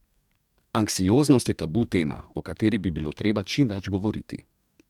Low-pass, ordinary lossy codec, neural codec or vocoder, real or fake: 19.8 kHz; none; codec, 44.1 kHz, 2.6 kbps, DAC; fake